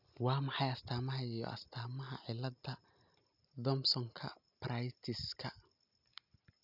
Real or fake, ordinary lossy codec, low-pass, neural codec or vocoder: real; none; 5.4 kHz; none